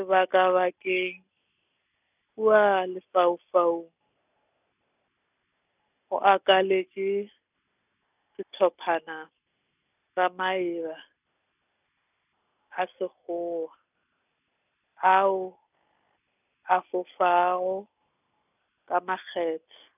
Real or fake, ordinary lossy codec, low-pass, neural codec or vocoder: real; none; 3.6 kHz; none